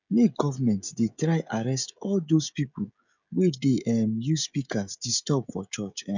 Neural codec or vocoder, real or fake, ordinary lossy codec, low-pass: codec, 16 kHz, 16 kbps, FreqCodec, smaller model; fake; none; 7.2 kHz